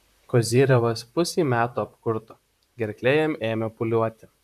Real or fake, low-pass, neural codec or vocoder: fake; 14.4 kHz; vocoder, 44.1 kHz, 128 mel bands, Pupu-Vocoder